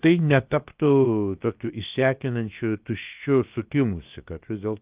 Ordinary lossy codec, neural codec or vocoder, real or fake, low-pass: Opus, 24 kbps; codec, 16 kHz, about 1 kbps, DyCAST, with the encoder's durations; fake; 3.6 kHz